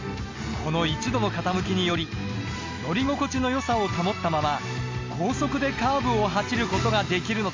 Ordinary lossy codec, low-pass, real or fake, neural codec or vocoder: MP3, 48 kbps; 7.2 kHz; real; none